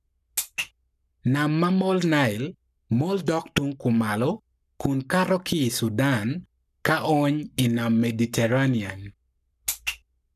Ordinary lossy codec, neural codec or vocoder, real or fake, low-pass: none; codec, 44.1 kHz, 7.8 kbps, Pupu-Codec; fake; 14.4 kHz